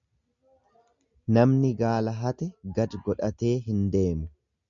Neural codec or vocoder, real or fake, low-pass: none; real; 7.2 kHz